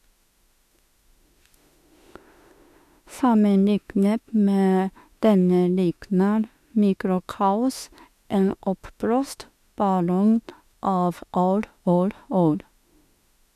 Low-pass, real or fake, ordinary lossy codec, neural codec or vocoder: 14.4 kHz; fake; none; autoencoder, 48 kHz, 32 numbers a frame, DAC-VAE, trained on Japanese speech